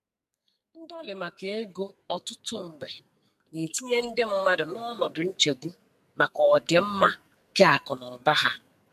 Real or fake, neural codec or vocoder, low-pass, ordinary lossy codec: fake; codec, 44.1 kHz, 2.6 kbps, SNAC; 14.4 kHz; MP3, 96 kbps